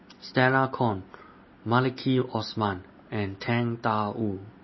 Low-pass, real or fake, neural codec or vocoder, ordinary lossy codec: 7.2 kHz; real; none; MP3, 24 kbps